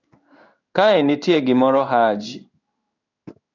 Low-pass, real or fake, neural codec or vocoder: 7.2 kHz; fake; codec, 16 kHz in and 24 kHz out, 1 kbps, XY-Tokenizer